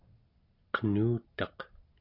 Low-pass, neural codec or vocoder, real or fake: 5.4 kHz; none; real